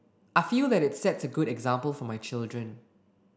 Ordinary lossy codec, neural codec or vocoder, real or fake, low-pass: none; none; real; none